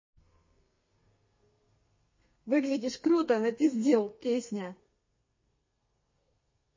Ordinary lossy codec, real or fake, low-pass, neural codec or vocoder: MP3, 32 kbps; fake; 7.2 kHz; codec, 32 kHz, 1.9 kbps, SNAC